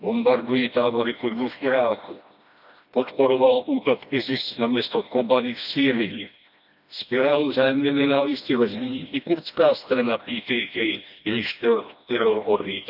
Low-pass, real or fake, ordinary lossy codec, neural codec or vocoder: 5.4 kHz; fake; none; codec, 16 kHz, 1 kbps, FreqCodec, smaller model